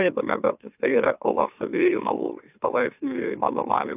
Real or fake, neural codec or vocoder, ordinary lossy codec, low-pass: fake; autoencoder, 44.1 kHz, a latent of 192 numbers a frame, MeloTTS; AAC, 32 kbps; 3.6 kHz